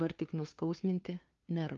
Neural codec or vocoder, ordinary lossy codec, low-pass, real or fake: codec, 16 kHz, 2 kbps, FunCodec, trained on LibriTTS, 25 frames a second; Opus, 32 kbps; 7.2 kHz; fake